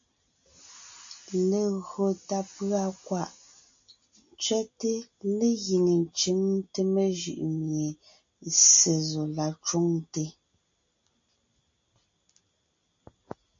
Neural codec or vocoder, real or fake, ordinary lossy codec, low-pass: none; real; AAC, 64 kbps; 7.2 kHz